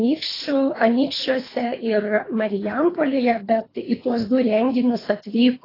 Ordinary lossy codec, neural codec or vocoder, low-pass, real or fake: AAC, 24 kbps; codec, 24 kHz, 3 kbps, HILCodec; 5.4 kHz; fake